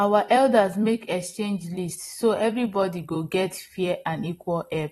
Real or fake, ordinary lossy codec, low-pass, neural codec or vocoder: fake; AAC, 32 kbps; 19.8 kHz; vocoder, 44.1 kHz, 128 mel bands every 256 samples, BigVGAN v2